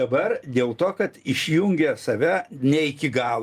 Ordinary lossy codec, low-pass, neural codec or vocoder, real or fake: Opus, 32 kbps; 14.4 kHz; none; real